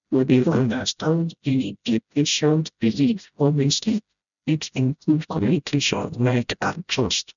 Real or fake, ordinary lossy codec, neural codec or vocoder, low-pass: fake; none; codec, 16 kHz, 0.5 kbps, FreqCodec, smaller model; 7.2 kHz